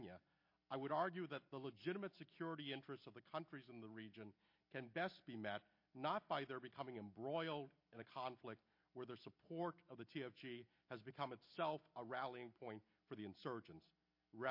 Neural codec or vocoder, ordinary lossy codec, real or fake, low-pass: none; MP3, 32 kbps; real; 5.4 kHz